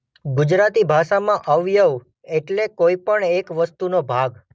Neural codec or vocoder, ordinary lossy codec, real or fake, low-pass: none; none; real; none